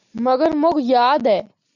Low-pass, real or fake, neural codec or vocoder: 7.2 kHz; real; none